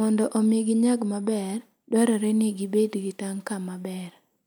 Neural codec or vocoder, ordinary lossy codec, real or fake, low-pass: none; none; real; none